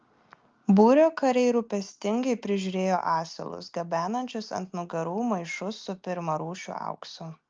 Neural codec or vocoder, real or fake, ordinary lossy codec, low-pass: none; real; Opus, 24 kbps; 7.2 kHz